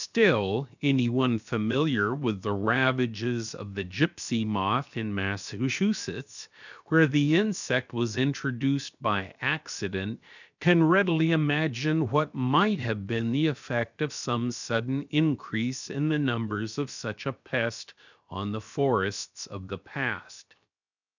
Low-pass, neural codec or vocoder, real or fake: 7.2 kHz; codec, 16 kHz, 0.7 kbps, FocalCodec; fake